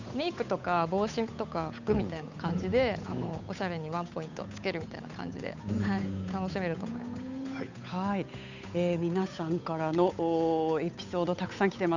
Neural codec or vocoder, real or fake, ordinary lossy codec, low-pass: codec, 16 kHz, 8 kbps, FunCodec, trained on Chinese and English, 25 frames a second; fake; none; 7.2 kHz